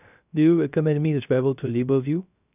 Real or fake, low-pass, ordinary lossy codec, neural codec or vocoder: fake; 3.6 kHz; none; codec, 16 kHz, 0.3 kbps, FocalCodec